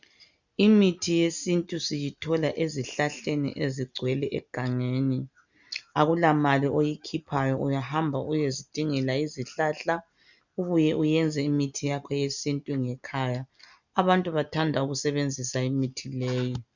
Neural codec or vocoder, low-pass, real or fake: none; 7.2 kHz; real